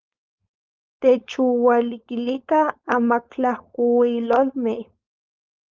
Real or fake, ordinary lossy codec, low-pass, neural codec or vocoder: fake; Opus, 24 kbps; 7.2 kHz; codec, 16 kHz, 4.8 kbps, FACodec